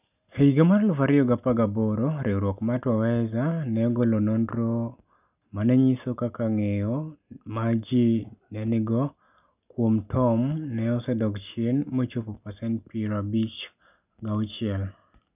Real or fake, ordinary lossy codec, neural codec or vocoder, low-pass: real; none; none; 3.6 kHz